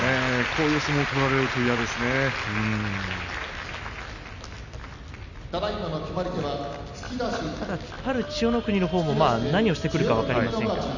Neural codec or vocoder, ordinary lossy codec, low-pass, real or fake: none; none; 7.2 kHz; real